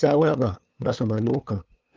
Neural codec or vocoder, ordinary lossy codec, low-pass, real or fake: codec, 16 kHz, 2 kbps, FunCodec, trained on LibriTTS, 25 frames a second; Opus, 32 kbps; 7.2 kHz; fake